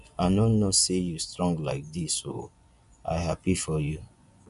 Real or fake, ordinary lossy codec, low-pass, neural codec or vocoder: fake; none; 10.8 kHz; vocoder, 24 kHz, 100 mel bands, Vocos